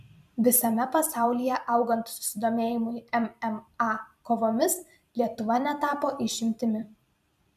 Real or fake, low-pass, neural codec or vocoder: fake; 14.4 kHz; vocoder, 44.1 kHz, 128 mel bands every 256 samples, BigVGAN v2